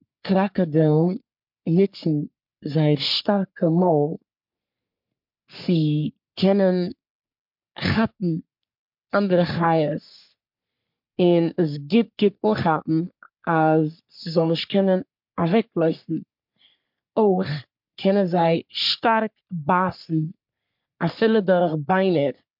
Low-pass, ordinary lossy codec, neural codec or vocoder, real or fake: 5.4 kHz; MP3, 48 kbps; codec, 44.1 kHz, 3.4 kbps, Pupu-Codec; fake